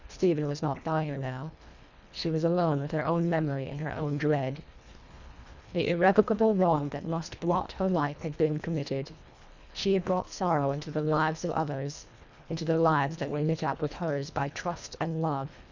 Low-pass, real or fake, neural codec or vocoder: 7.2 kHz; fake; codec, 24 kHz, 1.5 kbps, HILCodec